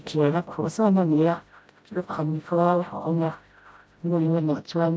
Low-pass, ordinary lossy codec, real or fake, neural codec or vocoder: none; none; fake; codec, 16 kHz, 0.5 kbps, FreqCodec, smaller model